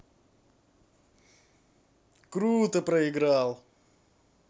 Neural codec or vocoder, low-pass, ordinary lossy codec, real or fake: none; none; none; real